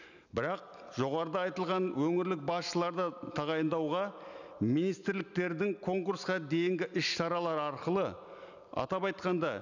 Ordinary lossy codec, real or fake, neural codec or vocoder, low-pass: none; real; none; 7.2 kHz